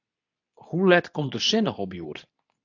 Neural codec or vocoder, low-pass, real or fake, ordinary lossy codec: codec, 24 kHz, 0.9 kbps, WavTokenizer, medium speech release version 2; 7.2 kHz; fake; AAC, 48 kbps